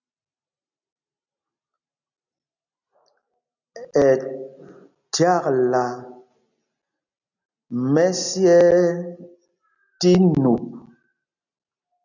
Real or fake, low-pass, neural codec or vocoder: real; 7.2 kHz; none